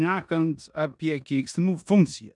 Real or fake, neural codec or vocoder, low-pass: fake; codec, 16 kHz in and 24 kHz out, 0.9 kbps, LongCat-Audio-Codec, four codebook decoder; 10.8 kHz